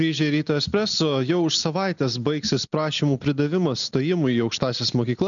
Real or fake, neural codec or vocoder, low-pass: real; none; 7.2 kHz